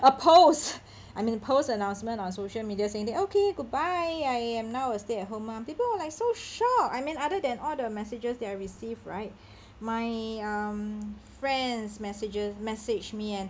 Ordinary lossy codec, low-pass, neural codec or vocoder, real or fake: none; none; none; real